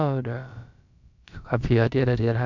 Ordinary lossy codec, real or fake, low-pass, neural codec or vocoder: none; fake; 7.2 kHz; codec, 16 kHz, about 1 kbps, DyCAST, with the encoder's durations